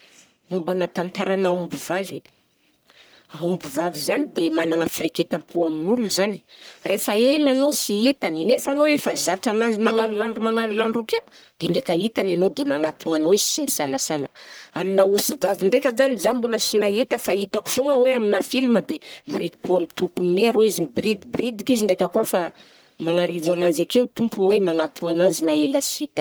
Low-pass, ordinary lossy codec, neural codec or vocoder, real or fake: none; none; codec, 44.1 kHz, 1.7 kbps, Pupu-Codec; fake